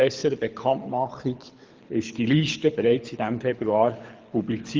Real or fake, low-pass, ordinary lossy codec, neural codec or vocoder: fake; 7.2 kHz; Opus, 32 kbps; codec, 24 kHz, 6 kbps, HILCodec